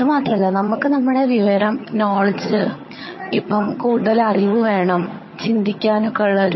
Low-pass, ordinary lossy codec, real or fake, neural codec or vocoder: 7.2 kHz; MP3, 24 kbps; fake; vocoder, 22.05 kHz, 80 mel bands, HiFi-GAN